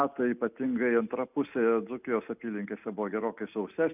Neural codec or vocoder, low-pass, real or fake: none; 3.6 kHz; real